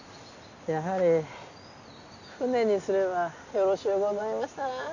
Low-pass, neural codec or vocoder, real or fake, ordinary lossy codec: 7.2 kHz; none; real; none